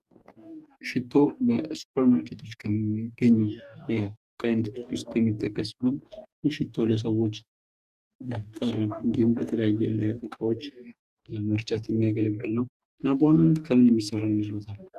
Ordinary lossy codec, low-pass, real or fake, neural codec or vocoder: Opus, 64 kbps; 14.4 kHz; fake; codec, 44.1 kHz, 2.6 kbps, DAC